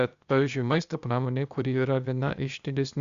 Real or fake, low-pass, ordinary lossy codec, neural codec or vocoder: fake; 7.2 kHz; Opus, 64 kbps; codec, 16 kHz, 0.8 kbps, ZipCodec